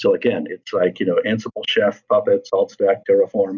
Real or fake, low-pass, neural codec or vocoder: real; 7.2 kHz; none